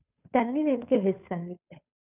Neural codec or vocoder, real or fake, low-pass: codec, 16 kHz, 2 kbps, FunCodec, trained on Chinese and English, 25 frames a second; fake; 3.6 kHz